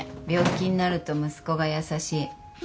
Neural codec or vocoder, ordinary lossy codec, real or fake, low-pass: none; none; real; none